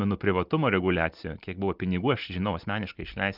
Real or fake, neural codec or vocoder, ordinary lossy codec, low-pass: real; none; Opus, 32 kbps; 5.4 kHz